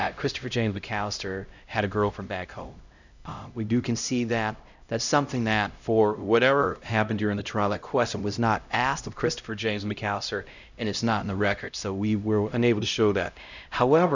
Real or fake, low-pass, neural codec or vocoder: fake; 7.2 kHz; codec, 16 kHz, 0.5 kbps, X-Codec, HuBERT features, trained on LibriSpeech